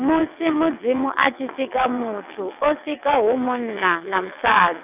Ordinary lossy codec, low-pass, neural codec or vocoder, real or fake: none; 3.6 kHz; vocoder, 22.05 kHz, 80 mel bands, WaveNeXt; fake